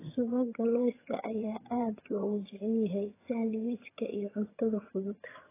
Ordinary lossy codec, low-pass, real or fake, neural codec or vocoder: AAC, 16 kbps; 3.6 kHz; fake; vocoder, 22.05 kHz, 80 mel bands, HiFi-GAN